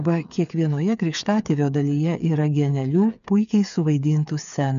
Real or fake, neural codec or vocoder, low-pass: fake; codec, 16 kHz, 8 kbps, FreqCodec, smaller model; 7.2 kHz